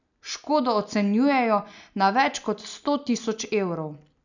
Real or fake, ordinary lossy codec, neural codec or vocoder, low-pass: real; none; none; 7.2 kHz